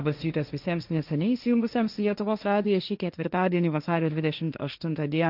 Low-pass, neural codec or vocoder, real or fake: 5.4 kHz; codec, 16 kHz, 1.1 kbps, Voila-Tokenizer; fake